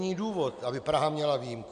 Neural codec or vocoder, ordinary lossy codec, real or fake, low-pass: none; AAC, 96 kbps; real; 9.9 kHz